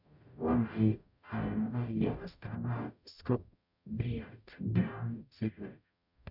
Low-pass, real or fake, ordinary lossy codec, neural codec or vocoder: 5.4 kHz; fake; none; codec, 44.1 kHz, 0.9 kbps, DAC